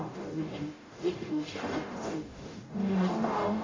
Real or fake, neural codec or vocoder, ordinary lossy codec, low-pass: fake; codec, 44.1 kHz, 0.9 kbps, DAC; AAC, 32 kbps; 7.2 kHz